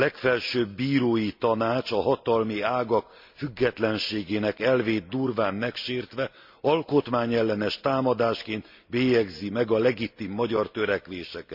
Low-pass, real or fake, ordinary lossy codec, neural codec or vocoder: 5.4 kHz; real; MP3, 48 kbps; none